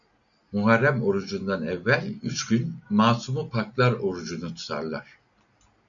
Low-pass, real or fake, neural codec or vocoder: 7.2 kHz; real; none